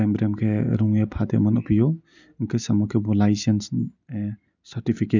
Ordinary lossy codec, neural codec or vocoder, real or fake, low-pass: none; none; real; 7.2 kHz